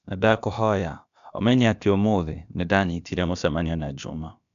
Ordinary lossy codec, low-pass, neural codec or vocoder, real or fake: none; 7.2 kHz; codec, 16 kHz, about 1 kbps, DyCAST, with the encoder's durations; fake